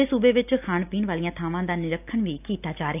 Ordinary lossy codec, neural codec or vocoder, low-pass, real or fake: none; none; 3.6 kHz; real